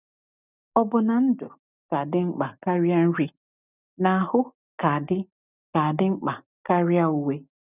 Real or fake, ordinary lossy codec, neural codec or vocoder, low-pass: real; none; none; 3.6 kHz